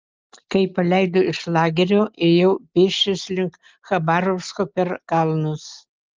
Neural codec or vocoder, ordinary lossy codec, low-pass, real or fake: none; Opus, 24 kbps; 7.2 kHz; real